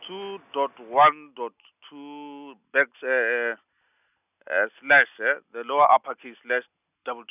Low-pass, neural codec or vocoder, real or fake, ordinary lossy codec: 3.6 kHz; none; real; none